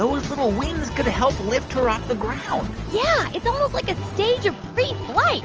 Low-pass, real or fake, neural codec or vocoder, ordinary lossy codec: 7.2 kHz; real; none; Opus, 24 kbps